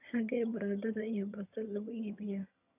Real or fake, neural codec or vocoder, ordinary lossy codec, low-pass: fake; vocoder, 22.05 kHz, 80 mel bands, HiFi-GAN; none; 3.6 kHz